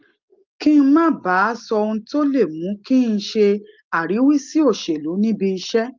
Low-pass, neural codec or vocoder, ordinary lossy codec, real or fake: 7.2 kHz; none; Opus, 32 kbps; real